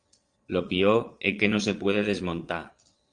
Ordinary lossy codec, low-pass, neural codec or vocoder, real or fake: Opus, 32 kbps; 9.9 kHz; vocoder, 22.05 kHz, 80 mel bands, Vocos; fake